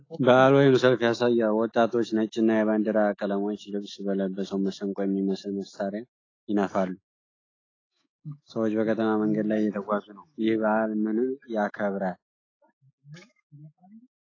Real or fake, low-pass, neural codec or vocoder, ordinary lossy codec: fake; 7.2 kHz; autoencoder, 48 kHz, 128 numbers a frame, DAC-VAE, trained on Japanese speech; AAC, 32 kbps